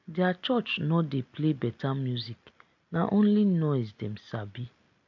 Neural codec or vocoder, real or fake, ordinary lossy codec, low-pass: none; real; AAC, 48 kbps; 7.2 kHz